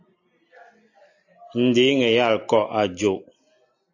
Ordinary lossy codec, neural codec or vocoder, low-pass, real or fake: AAC, 48 kbps; none; 7.2 kHz; real